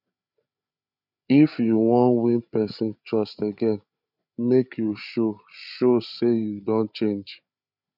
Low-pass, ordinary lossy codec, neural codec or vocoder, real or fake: 5.4 kHz; none; codec, 16 kHz, 8 kbps, FreqCodec, larger model; fake